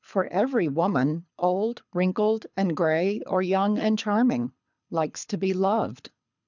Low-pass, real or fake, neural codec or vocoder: 7.2 kHz; fake; codec, 24 kHz, 6 kbps, HILCodec